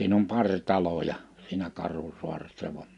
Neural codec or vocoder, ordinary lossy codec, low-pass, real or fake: none; none; 10.8 kHz; real